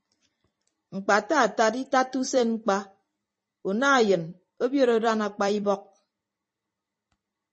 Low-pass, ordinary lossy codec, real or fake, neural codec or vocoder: 10.8 kHz; MP3, 32 kbps; fake; vocoder, 44.1 kHz, 128 mel bands every 256 samples, BigVGAN v2